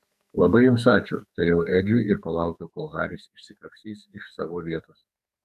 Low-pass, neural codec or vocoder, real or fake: 14.4 kHz; codec, 44.1 kHz, 2.6 kbps, SNAC; fake